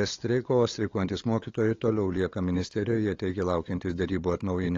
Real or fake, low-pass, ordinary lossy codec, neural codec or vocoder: fake; 7.2 kHz; AAC, 32 kbps; codec, 16 kHz, 8 kbps, FunCodec, trained on LibriTTS, 25 frames a second